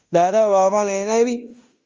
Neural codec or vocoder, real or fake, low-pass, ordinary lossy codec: codec, 16 kHz in and 24 kHz out, 0.9 kbps, LongCat-Audio-Codec, fine tuned four codebook decoder; fake; 7.2 kHz; Opus, 32 kbps